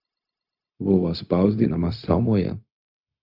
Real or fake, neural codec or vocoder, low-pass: fake; codec, 16 kHz, 0.4 kbps, LongCat-Audio-Codec; 5.4 kHz